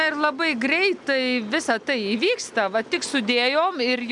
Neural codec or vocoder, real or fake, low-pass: none; real; 10.8 kHz